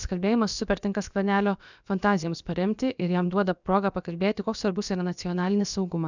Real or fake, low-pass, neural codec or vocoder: fake; 7.2 kHz; codec, 16 kHz, about 1 kbps, DyCAST, with the encoder's durations